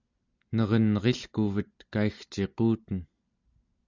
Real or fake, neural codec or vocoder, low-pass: real; none; 7.2 kHz